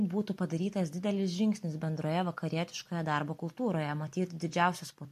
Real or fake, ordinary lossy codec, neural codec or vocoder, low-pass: real; MP3, 96 kbps; none; 14.4 kHz